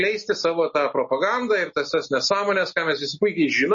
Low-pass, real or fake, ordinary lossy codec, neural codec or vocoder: 7.2 kHz; real; MP3, 32 kbps; none